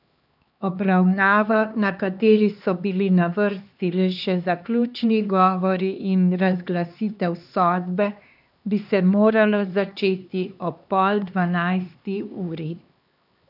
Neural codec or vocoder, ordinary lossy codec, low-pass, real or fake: codec, 16 kHz, 2 kbps, X-Codec, HuBERT features, trained on LibriSpeech; none; 5.4 kHz; fake